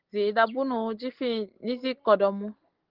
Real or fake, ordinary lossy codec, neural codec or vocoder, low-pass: real; Opus, 16 kbps; none; 5.4 kHz